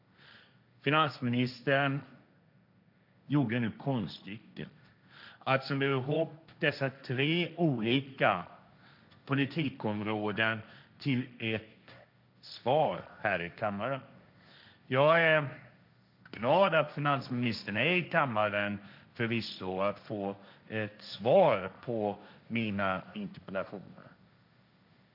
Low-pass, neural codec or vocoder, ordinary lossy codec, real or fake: 5.4 kHz; codec, 16 kHz, 1.1 kbps, Voila-Tokenizer; none; fake